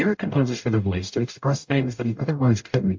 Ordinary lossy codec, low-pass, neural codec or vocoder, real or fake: MP3, 48 kbps; 7.2 kHz; codec, 44.1 kHz, 0.9 kbps, DAC; fake